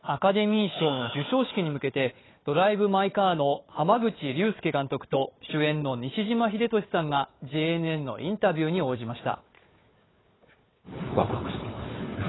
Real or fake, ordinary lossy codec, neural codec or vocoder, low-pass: fake; AAC, 16 kbps; codec, 16 kHz, 4 kbps, FunCodec, trained on Chinese and English, 50 frames a second; 7.2 kHz